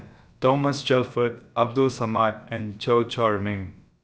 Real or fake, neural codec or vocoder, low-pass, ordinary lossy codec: fake; codec, 16 kHz, about 1 kbps, DyCAST, with the encoder's durations; none; none